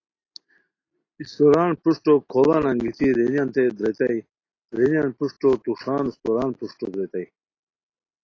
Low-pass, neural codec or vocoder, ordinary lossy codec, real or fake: 7.2 kHz; none; AAC, 32 kbps; real